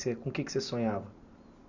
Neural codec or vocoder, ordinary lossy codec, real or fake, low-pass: none; none; real; 7.2 kHz